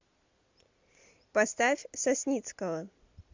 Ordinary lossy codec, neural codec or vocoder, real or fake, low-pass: MP3, 64 kbps; none; real; 7.2 kHz